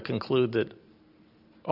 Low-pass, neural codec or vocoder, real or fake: 5.4 kHz; none; real